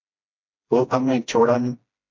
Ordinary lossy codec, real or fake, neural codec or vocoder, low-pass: MP3, 48 kbps; fake; codec, 16 kHz, 1 kbps, FreqCodec, smaller model; 7.2 kHz